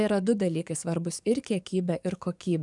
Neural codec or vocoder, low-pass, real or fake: codec, 44.1 kHz, 7.8 kbps, DAC; 10.8 kHz; fake